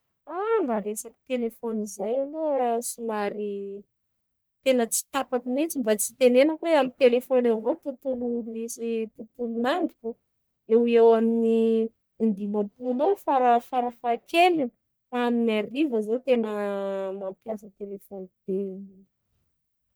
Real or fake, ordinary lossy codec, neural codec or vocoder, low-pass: fake; none; codec, 44.1 kHz, 1.7 kbps, Pupu-Codec; none